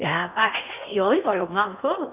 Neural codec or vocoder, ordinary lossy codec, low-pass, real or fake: codec, 16 kHz in and 24 kHz out, 0.6 kbps, FocalCodec, streaming, 4096 codes; none; 3.6 kHz; fake